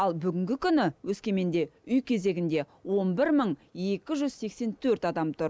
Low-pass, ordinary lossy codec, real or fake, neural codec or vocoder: none; none; real; none